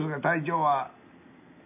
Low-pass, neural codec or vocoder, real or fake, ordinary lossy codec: 3.6 kHz; none; real; none